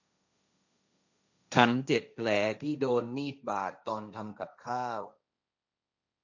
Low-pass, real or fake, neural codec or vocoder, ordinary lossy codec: 7.2 kHz; fake; codec, 16 kHz, 1.1 kbps, Voila-Tokenizer; none